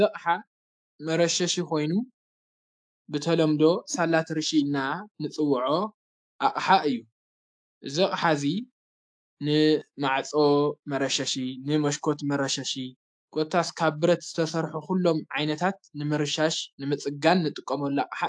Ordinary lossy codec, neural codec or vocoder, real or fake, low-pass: AAC, 64 kbps; autoencoder, 48 kHz, 128 numbers a frame, DAC-VAE, trained on Japanese speech; fake; 9.9 kHz